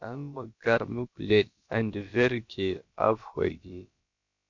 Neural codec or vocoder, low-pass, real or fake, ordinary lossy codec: codec, 16 kHz, about 1 kbps, DyCAST, with the encoder's durations; 7.2 kHz; fake; MP3, 48 kbps